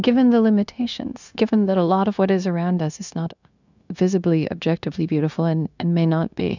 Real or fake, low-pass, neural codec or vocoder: fake; 7.2 kHz; codec, 16 kHz, 0.9 kbps, LongCat-Audio-Codec